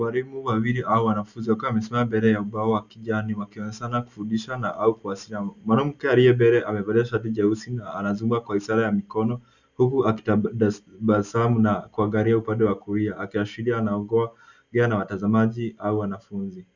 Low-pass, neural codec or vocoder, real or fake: 7.2 kHz; none; real